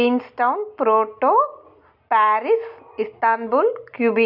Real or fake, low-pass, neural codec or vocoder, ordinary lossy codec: real; 5.4 kHz; none; none